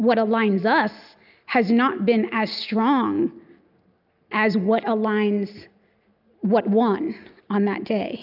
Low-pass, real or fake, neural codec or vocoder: 5.4 kHz; real; none